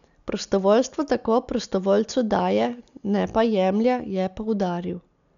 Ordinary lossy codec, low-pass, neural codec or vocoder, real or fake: none; 7.2 kHz; none; real